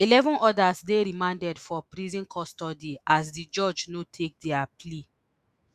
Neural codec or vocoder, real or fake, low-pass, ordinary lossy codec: autoencoder, 48 kHz, 128 numbers a frame, DAC-VAE, trained on Japanese speech; fake; 14.4 kHz; Opus, 64 kbps